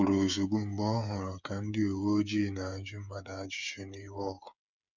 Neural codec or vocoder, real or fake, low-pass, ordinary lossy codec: codec, 16 kHz, 8 kbps, FreqCodec, smaller model; fake; 7.2 kHz; none